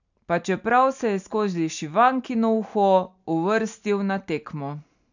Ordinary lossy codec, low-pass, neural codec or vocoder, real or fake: none; 7.2 kHz; none; real